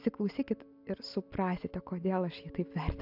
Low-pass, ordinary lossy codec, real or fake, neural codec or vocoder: 5.4 kHz; AAC, 48 kbps; real; none